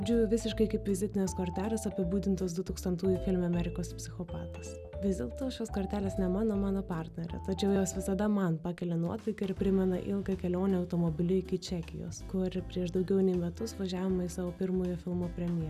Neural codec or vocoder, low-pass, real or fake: none; 14.4 kHz; real